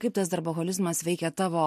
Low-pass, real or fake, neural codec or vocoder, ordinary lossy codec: 14.4 kHz; real; none; MP3, 64 kbps